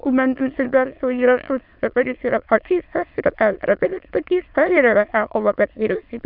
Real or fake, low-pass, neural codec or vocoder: fake; 5.4 kHz; autoencoder, 22.05 kHz, a latent of 192 numbers a frame, VITS, trained on many speakers